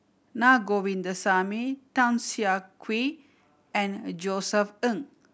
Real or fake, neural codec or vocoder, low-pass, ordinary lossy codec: real; none; none; none